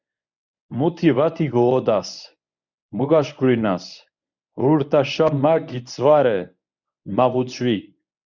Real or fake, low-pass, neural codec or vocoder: fake; 7.2 kHz; codec, 24 kHz, 0.9 kbps, WavTokenizer, medium speech release version 1